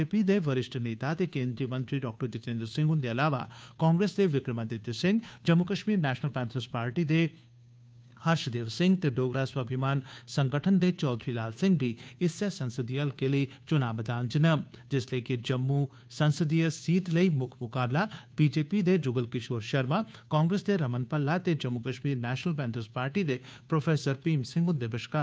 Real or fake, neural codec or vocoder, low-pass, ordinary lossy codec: fake; codec, 16 kHz, 2 kbps, FunCodec, trained on Chinese and English, 25 frames a second; none; none